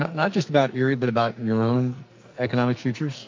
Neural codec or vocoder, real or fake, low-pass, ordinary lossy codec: codec, 44.1 kHz, 2.6 kbps, SNAC; fake; 7.2 kHz; MP3, 48 kbps